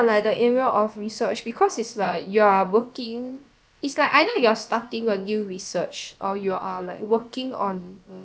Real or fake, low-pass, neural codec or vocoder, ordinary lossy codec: fake; none; codec, 16 kHz, about 1 kbps, DyCAST, with the encoder's durations; none